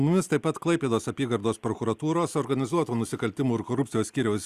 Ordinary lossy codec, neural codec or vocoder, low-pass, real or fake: Opus, 64 kbps; none; 14.4 kHz; real